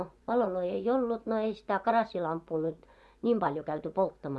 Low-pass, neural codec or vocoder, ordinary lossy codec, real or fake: none; vocoder, 24 kHz, 100 mel bands, Vocos; none; fake